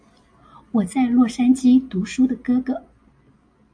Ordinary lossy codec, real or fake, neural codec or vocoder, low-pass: AAC, 64 kbps; real; none; 9.9 kHz